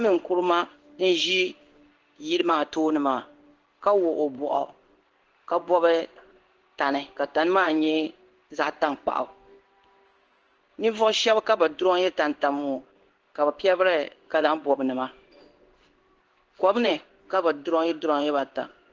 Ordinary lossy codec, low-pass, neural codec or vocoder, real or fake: Opus, 16 kbps; 7.2 kHz; codec, 16 kHz in and 24 kHz out, 1 kbps, XY-Tokenizer; fake